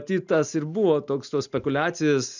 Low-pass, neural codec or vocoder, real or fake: 7.2 kHz; none; real